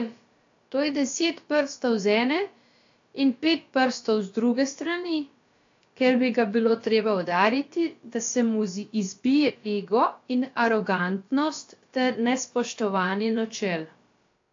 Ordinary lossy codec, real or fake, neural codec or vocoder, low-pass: AAC, 48 kbps; fake; codec, 16 kHz, about 1 kbps, DyCAST, with the encoder's durations; 7.2 kHz